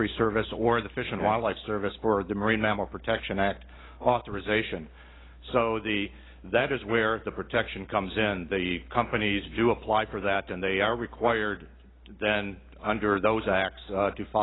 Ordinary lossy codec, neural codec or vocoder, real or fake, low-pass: AAC, 16 kbps; none; real; 7.2 kHz